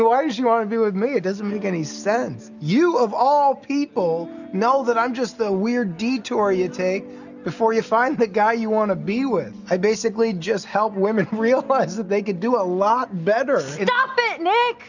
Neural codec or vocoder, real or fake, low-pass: none; real; 7.2 kHz